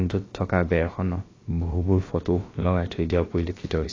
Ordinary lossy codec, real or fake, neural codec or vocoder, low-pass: AAC, 32 kbps; fake; codec, 16 kHz, about 1 kbps, DyCAST, with the encoder's durations; 7.2 kHz